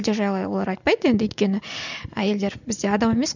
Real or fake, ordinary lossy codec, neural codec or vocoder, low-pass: real; none; none; 7.2 kHz